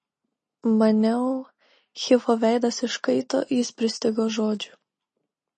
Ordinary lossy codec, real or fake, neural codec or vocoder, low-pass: MP3, 32 kbps; real; none; 10.8 kHz